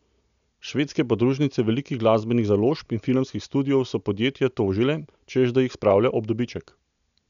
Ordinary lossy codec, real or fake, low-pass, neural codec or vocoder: none; real; 7.2 kHz; none